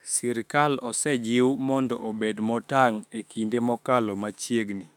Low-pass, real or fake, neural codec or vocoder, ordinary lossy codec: 19.8 kHz; fake; autoencoder, 48 kHz, 32 numbers a frame, DAC-VAE, trained on Japanese speech; none